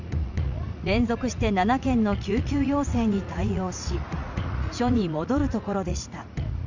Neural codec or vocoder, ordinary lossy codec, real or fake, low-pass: vocoder, 44.1 kHz, 80 mel bands, Vocos; none; fake; 7.2 kHz